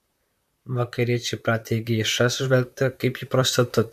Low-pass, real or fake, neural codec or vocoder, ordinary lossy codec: 14.4 kHz; fake; vocoder, 44.1 kHz, 128 mel bands, Pupu-Vocoder; AAC, 96 kbps